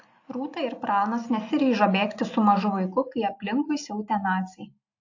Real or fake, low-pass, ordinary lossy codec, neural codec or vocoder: real; 7.2 kHz; AAC, 48 kbps; none